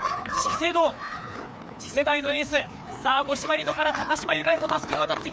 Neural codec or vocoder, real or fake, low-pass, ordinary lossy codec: codec, 16 kHz, 2 kbps, FreqCodec, larger model; fake; none; none